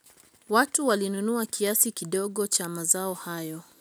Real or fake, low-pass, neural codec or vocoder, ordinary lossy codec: real; none; none; none